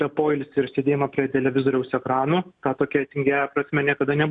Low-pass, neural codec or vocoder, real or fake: 9.9 kHz; none; real